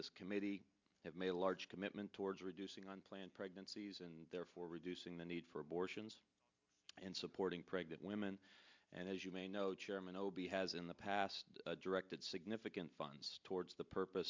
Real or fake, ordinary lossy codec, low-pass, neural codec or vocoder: real; MP3, 64 kbps; 7.2 kHz; none